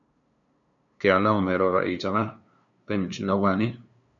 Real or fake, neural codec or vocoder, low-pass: fake; codec, 16 kHz, 2 kbps, FunCodec, trained on LibriTTS, 25 frames a second; 7.2 kHz